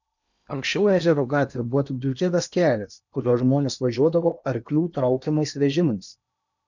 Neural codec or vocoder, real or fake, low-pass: codec, 16 kHz in and 24 kHz out, 0.8 kbps, FocalCodec, streaming, 65536 codes; fake; 7.2 kHz